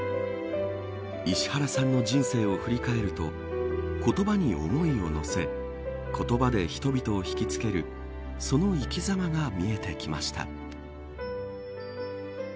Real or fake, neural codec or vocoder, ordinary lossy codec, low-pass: real; none; none; none